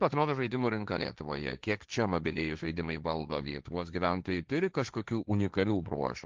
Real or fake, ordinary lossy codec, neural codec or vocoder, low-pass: fake; Opus, 24 kbps; codec, 16 kHz, 1.1 kbps, Voila-Tokenizer; 7.2 kHz